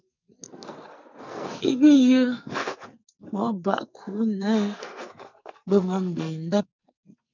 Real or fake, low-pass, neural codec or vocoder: fake; 7.2 kHz; codec, 44.1 kHz, 2.6 kbps, SNAC